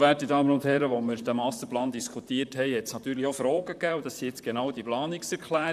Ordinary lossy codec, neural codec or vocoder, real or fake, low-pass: none; vocoder, 44.1 kHz, 128 mel bands, Pupu-Vocoder; fake; 14.4 kHz